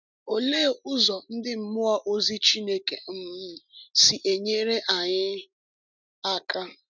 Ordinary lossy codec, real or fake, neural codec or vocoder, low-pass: none; real; none; 7.2 kHz